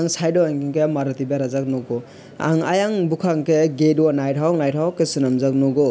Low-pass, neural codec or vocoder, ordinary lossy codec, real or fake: none; none; none; real